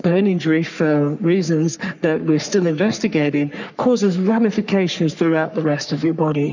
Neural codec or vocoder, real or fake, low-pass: codec, 44.1 kHz, 3.4 kbps, Pupu-Codec; fake; 7.2 kHz